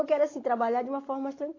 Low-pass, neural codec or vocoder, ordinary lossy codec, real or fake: 7.2 kHz; none; AAC, 32 kbps; real